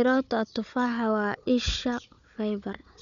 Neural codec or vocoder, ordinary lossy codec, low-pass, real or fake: codec, 16 kHz, 4 kbps, FunCodec, trained on Chinese and English, 50 frames a second; none; 7.2 kHz; fake